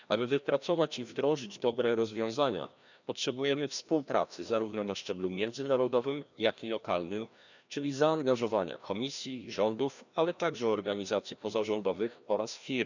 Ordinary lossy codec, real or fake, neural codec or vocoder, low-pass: none; fake; codec, 16 kHz, 1 kbps, FreqCodec, larger model; 7.2 kHz